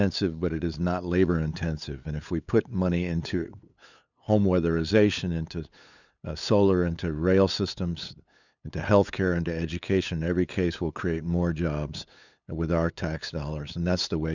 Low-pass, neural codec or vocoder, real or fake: 7.2 kHz; codec, 16 kHz, 8 kbps, FunCodec, trained on LibriTTS, 25 frames a second; fake